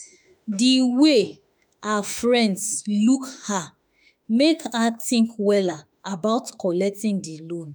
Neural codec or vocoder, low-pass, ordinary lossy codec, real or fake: autoencoder, 48 kHz, 32 numbers a frame, DAC-VAE, trained on Japanese speech; none; none; fake